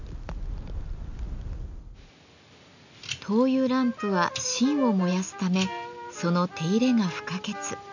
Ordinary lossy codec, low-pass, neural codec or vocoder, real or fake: none; 7.2 kHz; none; real